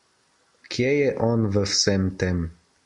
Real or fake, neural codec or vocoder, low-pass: real; none; 10.8 kHz